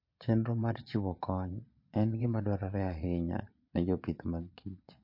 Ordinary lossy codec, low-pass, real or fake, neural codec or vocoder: MP3, 32 kbps; 5.4 kHz; fake; vocoder, 22.05 kHz, 80 mel bands, Vocos